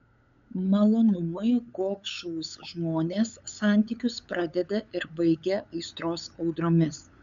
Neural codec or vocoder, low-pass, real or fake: codec, 16 kHz, 8 kbps, FunCodec, trained on LibriTTS, 25 frames a second; 7.2 kHz; fake